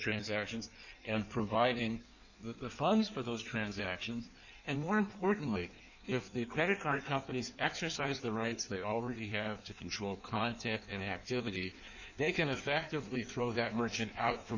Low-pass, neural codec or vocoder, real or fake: 7.2 kHz; codec, 16 kHz in and 24 kHz out, 1.1 kbps, FireRedTTS-2 codec; fake